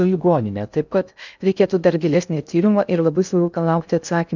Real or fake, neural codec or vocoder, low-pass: fake; codec, 16 kHz in and 24 kHz out, 0.6 kbps, FocalCodec, streaming, 2048 codes; 7.2 kHz